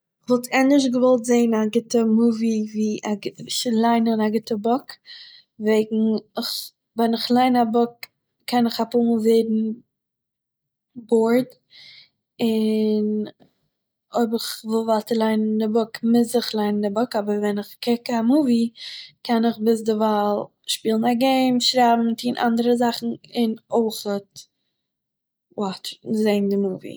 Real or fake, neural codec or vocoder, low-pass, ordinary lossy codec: real; none; none; none